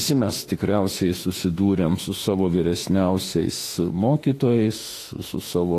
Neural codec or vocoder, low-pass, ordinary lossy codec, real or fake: autoencoder, 48 kHz, 32 numbers a frame, DAC-VAE, trained on Japanese speech; 14.4 kHz; AAC, 48 kbps; fake